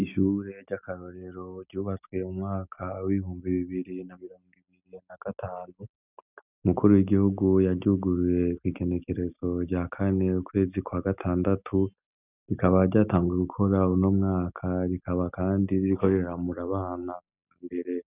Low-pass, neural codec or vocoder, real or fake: 3.6 kHz; none; real